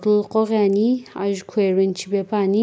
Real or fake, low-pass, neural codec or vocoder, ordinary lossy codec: real; none; none; none